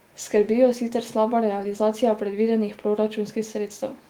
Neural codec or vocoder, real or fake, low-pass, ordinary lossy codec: autoencoder, 48 kHz, 128 numbers a frame, DAC-VAE, trained on Japanese speech; fake; 19.8 kHz; Opus, 24 kbps